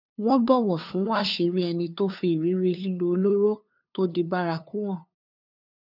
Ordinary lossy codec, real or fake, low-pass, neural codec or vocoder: none; fake; 5.4 kHz; codec, 16 kHz, 2 kbps, FunCodec, trained on LibriTTS, 25 frames a second